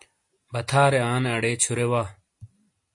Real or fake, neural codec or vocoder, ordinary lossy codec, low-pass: real; none; MP3, 48 kbps; 10.8 kHz